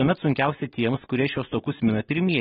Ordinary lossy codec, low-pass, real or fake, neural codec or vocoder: AAC, 16 kbps; 7.2 kHz; real; none